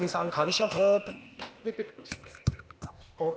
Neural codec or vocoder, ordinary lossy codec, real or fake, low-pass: codec, 16 kHz, 0.8 kbps, ZipCodec; none; fake; none